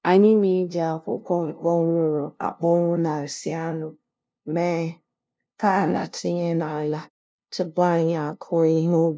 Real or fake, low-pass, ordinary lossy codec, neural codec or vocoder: fake; none; none; codec, 16 kHz, 0.5 kbps, FunCodec, trained on LibriTTS, 25 frames a second